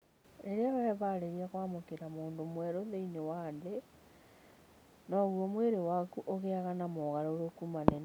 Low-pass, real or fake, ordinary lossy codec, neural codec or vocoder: none; real; none; none